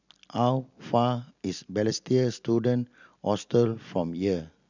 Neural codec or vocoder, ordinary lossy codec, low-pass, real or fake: none; none; 7.2 kHz; real